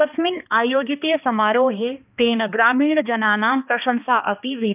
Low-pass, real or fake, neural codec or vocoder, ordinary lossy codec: 3.6 kHz; fake; codec, 16 kHz, 2 kbps, X-Codec, HuBERT features, trained on balanced general audio; none